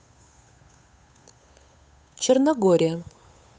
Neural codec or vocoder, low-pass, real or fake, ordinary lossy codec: codec, 16 kHz, 8 kbps, FunCodec, trained on Chinese and English, 25 frames a second; none; fake; none